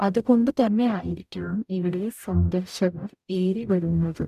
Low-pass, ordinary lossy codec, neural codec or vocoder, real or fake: 14.4 kHz; none; codec, 44.1 kHz, 0.9 kbps, DAC; fake